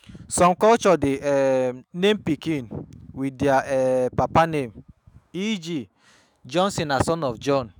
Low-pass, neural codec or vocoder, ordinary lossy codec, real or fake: 19.8 kHz; autoencoder, 48 kHz, 128 numbers a frame, DAC-VAE, trained on Japanese speech; none; fake